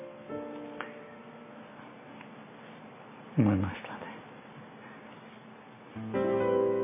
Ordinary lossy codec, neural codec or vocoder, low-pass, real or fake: MP3, 24 kbps; none; 3.6 kHz; real